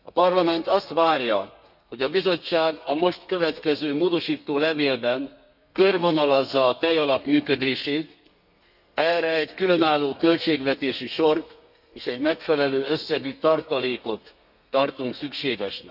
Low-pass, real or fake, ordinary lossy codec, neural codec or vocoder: 5.4 kHz; fake; none; codec, 32 kHz, 1.9 kbps, SNAC